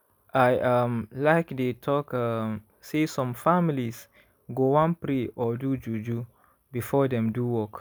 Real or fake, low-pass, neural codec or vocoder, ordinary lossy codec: real; none; none; none